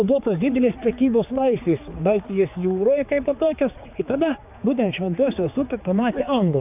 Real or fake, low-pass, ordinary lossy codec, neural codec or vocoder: fake; 3.6 kHz; AAC, 32 kbps; codec, 16 kHz, 4 kbps, X-Codec, HuBERT features, trained on general audio